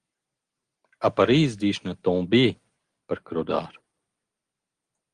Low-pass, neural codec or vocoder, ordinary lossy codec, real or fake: 9.9 kHz; none; Opus, 24 kbps; real